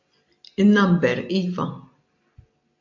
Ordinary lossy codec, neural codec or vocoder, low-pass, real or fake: MP3, 48 kbps; none; 7.2 kHz; real